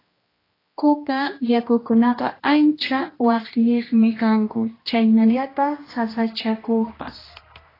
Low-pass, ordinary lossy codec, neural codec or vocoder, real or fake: 5.4 kHz; AAC, 24 kbps; codec, 16 kHz, 1 kbps, X-Codec, HuBERT features, trained on general audio; fake